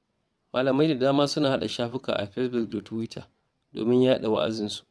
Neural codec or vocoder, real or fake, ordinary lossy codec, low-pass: vocoder, 22.05 kHz, 80 mel bands, WaveNeXt; fake; none; none